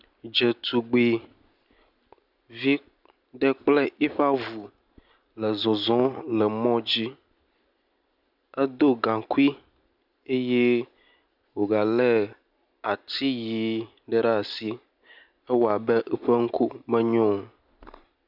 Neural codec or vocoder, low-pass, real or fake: none; 5.4 kHz; real